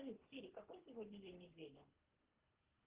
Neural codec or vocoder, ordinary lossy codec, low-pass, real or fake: codec, 24 kHz, 3 kbps, HILCodec; Opus, 16 kbps; 3.6 kHz; fake